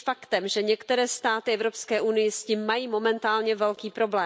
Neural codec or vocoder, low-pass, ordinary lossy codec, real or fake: none; none; none; real